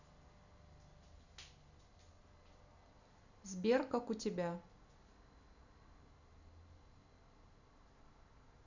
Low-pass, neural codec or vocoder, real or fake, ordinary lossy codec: 7.2 kHz; none; real; none